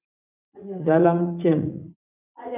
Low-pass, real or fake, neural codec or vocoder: 3.6 kHz; fake; vocoder, 44.1 kHz, 80 mel bands, Vocos